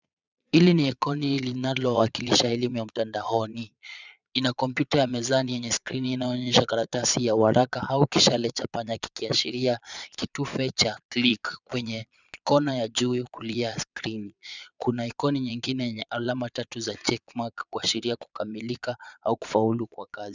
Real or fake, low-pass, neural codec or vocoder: fake; 7.2 kHz; vocoder, 22.05 kHz, 80 mel bands, Vocos